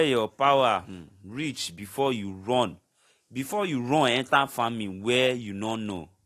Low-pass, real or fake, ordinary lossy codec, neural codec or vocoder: 14.4 kHz; real; AAC, 48 kbps; none